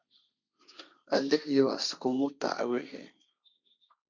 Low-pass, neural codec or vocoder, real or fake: 7.2 kHz; codec, 16 kHz, 1.1 kbps, Voila-Tokenizer; fake